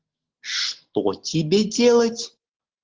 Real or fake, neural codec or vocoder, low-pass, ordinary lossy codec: real; none; 7.2 kHz; Opus, 16 kbps